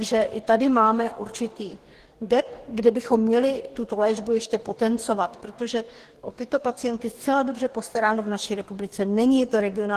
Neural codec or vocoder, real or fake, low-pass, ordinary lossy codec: codec, 44.1 kHz, 2.6 kbps, DAC; fake; 14.4 kHz; Opus, 16 kbps